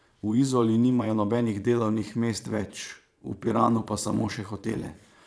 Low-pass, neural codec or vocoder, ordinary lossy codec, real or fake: none; vocoder, 22.05 kHz, 80 mel bands, Vocos; none; fake